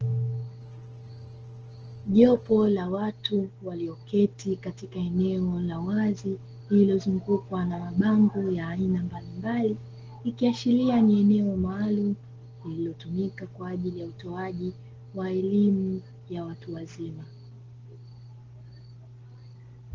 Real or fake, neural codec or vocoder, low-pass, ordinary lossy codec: real; none; 7.2 kHz; Opus, 16 kbps